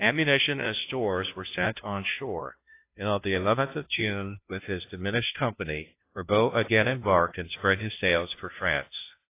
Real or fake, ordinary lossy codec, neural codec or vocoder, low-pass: fake; AAC, 24 kbps; codec, 16 kHz, 0.5 kbps, FunCodec, trained on Chinese and English, 25 frames a second; 3.6 kHz